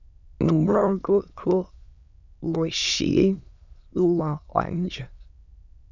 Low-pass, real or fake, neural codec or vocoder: 7.2 kHz; fake; autoencoder, 22.05 kHz, a latent of 192 numbers a frame, VITS, trained on many speakers